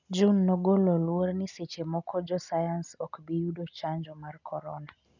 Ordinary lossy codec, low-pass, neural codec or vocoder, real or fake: none; 7.2 kHz; none; real